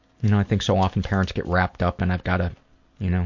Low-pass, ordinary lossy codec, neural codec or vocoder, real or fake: 7.2 kHz; MP3, 48 kbps; none; real